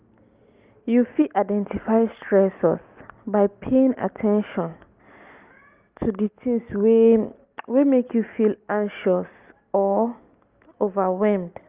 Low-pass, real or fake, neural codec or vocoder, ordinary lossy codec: 3.6 kHz; real; none; Opus, 24 kbps